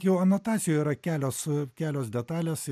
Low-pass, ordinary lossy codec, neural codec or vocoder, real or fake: 14.4 kHz; MP3, 96 kbps; none; real